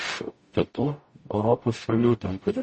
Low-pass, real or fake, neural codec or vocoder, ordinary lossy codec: 10.8 kHz; fake; codec, 44.1 kHz, 0.9 kbps, DAC; MP3, 32 kbps